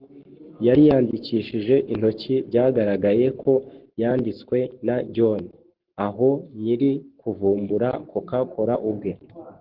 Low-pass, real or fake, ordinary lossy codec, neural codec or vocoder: 5.4 kHz; fake; Opus, 16 kbps; codec, 44.1 kHz, 7.8 kbps, Pupu-Codec